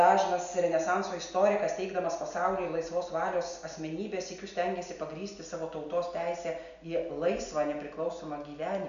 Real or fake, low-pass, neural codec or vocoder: real; 7.2 kHz; none